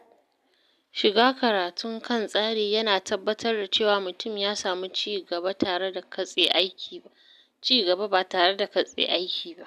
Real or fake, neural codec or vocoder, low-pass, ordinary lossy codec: real; none; 14.4 kHz; none